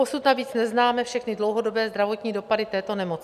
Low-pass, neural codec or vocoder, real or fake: 14.4 kHz; none; real